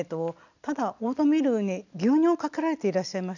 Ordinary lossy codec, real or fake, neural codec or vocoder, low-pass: none; real; none; 7.2 kHz